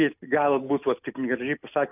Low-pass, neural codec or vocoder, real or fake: 3.6 kHz; none; real